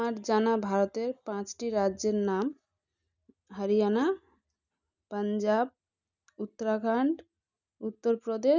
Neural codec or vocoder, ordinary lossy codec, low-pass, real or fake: none; none; 7.2 kHz; real